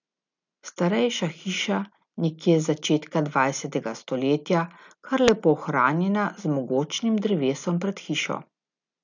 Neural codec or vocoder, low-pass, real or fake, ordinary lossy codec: none; 7.2 kHz; real; none